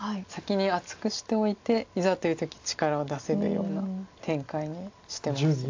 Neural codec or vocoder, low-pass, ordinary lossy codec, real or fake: none; 7.2 kHz; AAC, 48 kbps; real